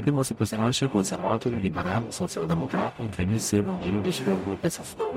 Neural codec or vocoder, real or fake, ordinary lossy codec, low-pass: codec, 44.1 kHz, 0.9 kbps, DAC; fake; MP3, 64 kbps; 19.8 kHz